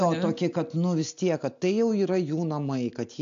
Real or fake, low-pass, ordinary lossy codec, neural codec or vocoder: real; 7.2 kHz; AAC, 64 kbps; none